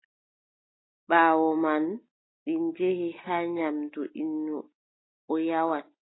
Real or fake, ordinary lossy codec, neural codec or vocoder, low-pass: real; AAC, 16 kbps; none; 7.2 kHz